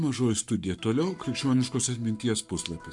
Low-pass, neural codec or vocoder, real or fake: 10.8 kHz; codec, 44.1 kHz, 7.8 kbps, Pupu-Codec; fake